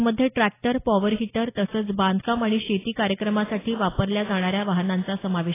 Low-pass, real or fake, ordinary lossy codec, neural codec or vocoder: 3.6 kHz; real; AAC, 16 kbps; none